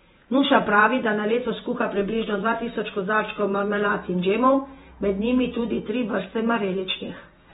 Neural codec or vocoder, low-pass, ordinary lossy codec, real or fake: vocoder, 48 kHz, 128 mel bands, Vocos; 19.8 kHz; AAC, 16 kbps; fake